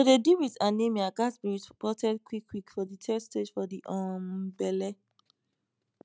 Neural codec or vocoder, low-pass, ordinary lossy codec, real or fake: none; none; none; real